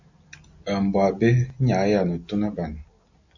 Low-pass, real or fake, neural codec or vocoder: 7.2 kHz; real; none